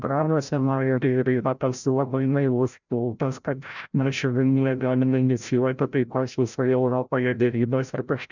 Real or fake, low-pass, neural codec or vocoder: fake; 7.2 kHz; codec, 16 kHz, 0.5 kbps, FreqCodec, larger model